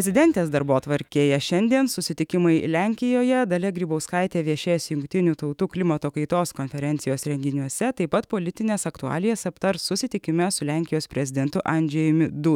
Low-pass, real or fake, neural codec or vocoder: 19.8 kHz; fake; autoencoder, 48 kHz, 128 numbers a frame, DAC-VAE, trained on Japanese speech